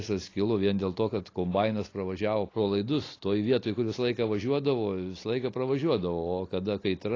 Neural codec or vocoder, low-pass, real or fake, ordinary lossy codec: none; 7.2 kHz; real; AAC, 32 kbps